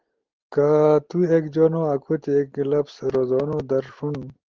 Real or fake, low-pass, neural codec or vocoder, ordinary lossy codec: real; 7.2 kHz; none; Opus, 16 kbps